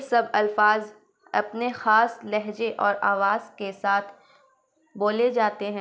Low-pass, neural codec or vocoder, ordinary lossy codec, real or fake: none; none; none; real